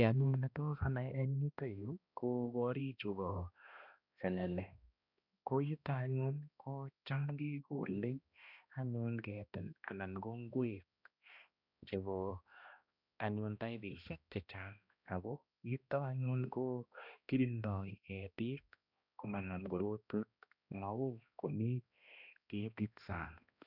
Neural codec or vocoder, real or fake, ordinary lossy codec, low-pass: codec, 16 kHz, 1 kbps, X-Codec, HuBERT features, trained on balanced general audio; fake; none; 5.4 kHz